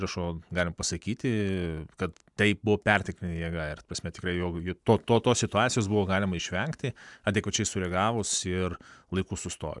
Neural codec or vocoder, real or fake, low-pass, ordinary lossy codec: none; real; 10.8 kHz; MP3, 96 kbps